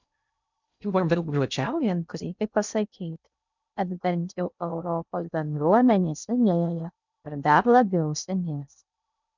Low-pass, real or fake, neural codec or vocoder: 7.2 kHz; fake; codec, 16 kHz in and 24 kHz out, 0.6 kbps, FocalCodec, streaming, 2048 codes